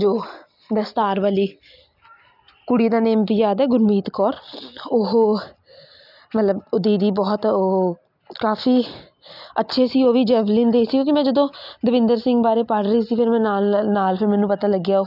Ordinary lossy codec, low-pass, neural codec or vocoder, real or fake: none; 5.4 kHz; none; real